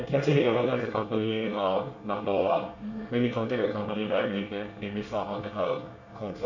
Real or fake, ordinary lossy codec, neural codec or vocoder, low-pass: fake; none; codec, 24 kHz, 1 kbps, SNAC; 7.2 kHz